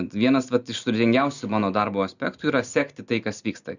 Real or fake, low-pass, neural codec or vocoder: real; 7.2 kHz; none